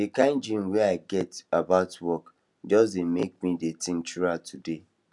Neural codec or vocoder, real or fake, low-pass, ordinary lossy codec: none; real; 10.8 kHz; none